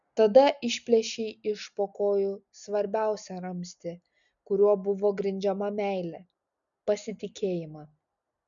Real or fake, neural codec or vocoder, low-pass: real; none; 7.2 kHz